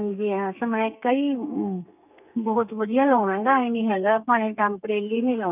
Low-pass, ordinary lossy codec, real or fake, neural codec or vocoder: 3.6 kHz; none; fake; codec, 32 kHz, 1.9 kbps, SNAC